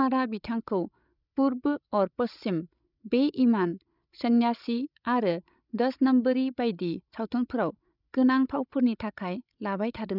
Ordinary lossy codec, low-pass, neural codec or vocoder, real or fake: none; 5.4 kHz; codec, 16 kHz, 16 kbps, FreqCodec, larger model; fake